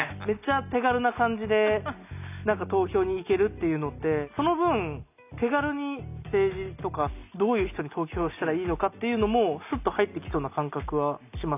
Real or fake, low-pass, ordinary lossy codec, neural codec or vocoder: real; 3.6 kHz; none; none